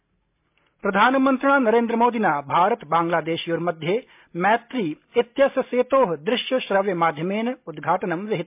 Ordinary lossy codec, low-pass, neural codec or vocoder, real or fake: MP3, 32 kbps; 3.6 kHz; none; real